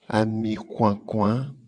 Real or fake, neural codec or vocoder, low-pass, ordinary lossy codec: fake; vocoder, 22.05 kHz, 80 mel bands, WaveNeXt; 9.9 kHz; AAC, 64 kbps